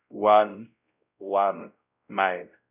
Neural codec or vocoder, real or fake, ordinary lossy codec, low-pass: codec, 16 kHz, 0.5 kbps, X-Codec, WavLM features, trained on Multilingual LibriSpeech; fake; none; 3.6 kHz